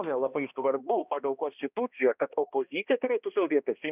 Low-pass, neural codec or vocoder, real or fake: 3.6 kHz; codec, 16 kHz, 1 kbps, X-Codec, HuBERT features, trained on balanced general audio; fake